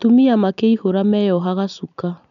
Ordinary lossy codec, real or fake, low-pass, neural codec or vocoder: none; real; 7.2 kHz; none